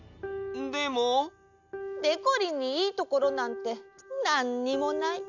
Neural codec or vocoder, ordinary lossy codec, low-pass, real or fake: none; none; 7.2 kHz; real